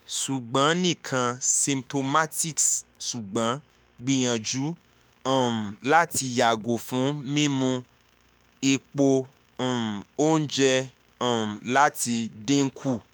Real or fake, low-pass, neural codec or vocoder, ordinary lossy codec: fake; none; autoencoder, 48 kHz, 32 numbers a frame, DAC-VAE, trained on Japanese speech; none